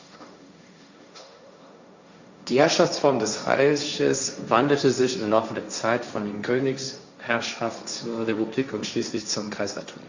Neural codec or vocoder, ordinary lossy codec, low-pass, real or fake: codec, 16 kHz, 1.1 kbps, Voila-Tokenizer; Opus, 64 kbps; 7.2 kHz; fake